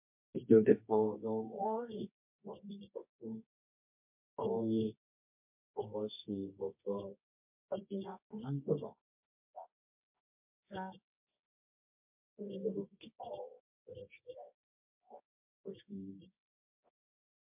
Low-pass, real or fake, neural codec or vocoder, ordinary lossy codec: 3.6 kHz; fake; codec, 24 kHz, 0.9 kbps, WavTokenizer, medium music audio release; MP3, 32 kbps